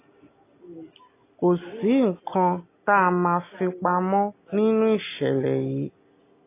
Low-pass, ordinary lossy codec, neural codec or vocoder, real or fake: 3.6 kHz; AAC, 24 kbps; none; real